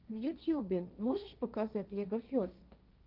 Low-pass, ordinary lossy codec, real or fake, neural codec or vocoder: 5.4 kHz; Opus, 24 kbps; fake; codec, 16 kHz, 1.1 kbps, Voila-Tokenizer